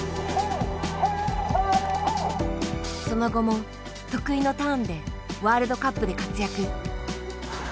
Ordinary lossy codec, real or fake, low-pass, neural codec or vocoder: none; real; none; none